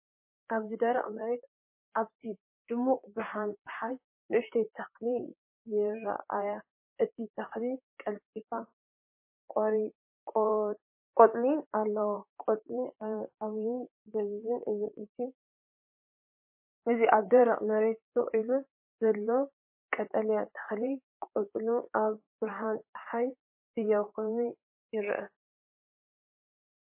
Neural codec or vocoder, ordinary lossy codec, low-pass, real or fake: vocoder, 44.1 kHz, 128 mel bands, Pupu-Vocoder; MP3, 24 kbps; 3.6 kHz; fake